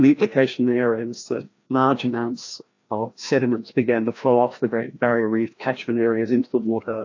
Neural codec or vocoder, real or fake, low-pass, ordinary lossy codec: codec, 16 kHz, 1 kbps, FreqCodec, larger model; fake; 7.2 kHz; AAC, 48 kbps